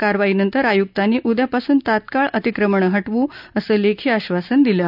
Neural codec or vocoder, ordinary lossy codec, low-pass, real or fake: none; none; 5.4 kHz; real